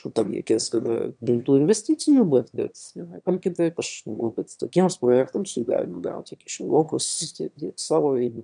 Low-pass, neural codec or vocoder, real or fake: 9.9 kHz; autoencoder, 22.05 kHz, a latent of 192 numbers a frame, VITS, trained on one speaker; fake